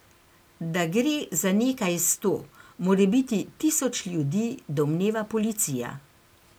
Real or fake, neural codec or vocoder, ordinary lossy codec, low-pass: real; none; none; none